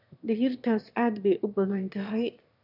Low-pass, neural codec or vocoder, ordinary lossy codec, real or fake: 5.4 kHz; autoencoder, 22.05 kHz, a latent of 192 numbers a frame, VITS, trained on one speaker; none; fake